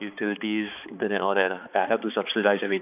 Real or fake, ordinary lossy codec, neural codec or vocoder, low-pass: fake; none; codec, 16 kHz, 4 kbps, X-Codec, HuBERT features, trained on balanced general audio; 3.6 kHz